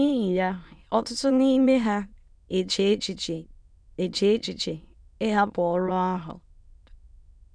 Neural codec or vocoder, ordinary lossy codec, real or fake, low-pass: autoencoder, 22.05 kHz, a latent of 192 numbers a frame, VITS, trained on many speakers; none; fake; 9.9 kHz